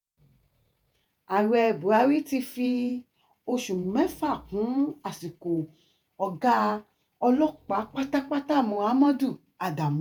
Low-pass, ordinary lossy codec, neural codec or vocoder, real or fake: none; none; vocoder, 48 kHz, 128 mel bands, Vocos; fake